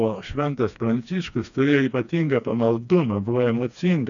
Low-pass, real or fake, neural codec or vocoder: 7.2 kHz; fake; codec, 16 kHz, 2 kbps, FreqCodec, smaller model